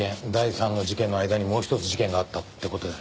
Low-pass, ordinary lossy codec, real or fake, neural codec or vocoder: none; none; real; none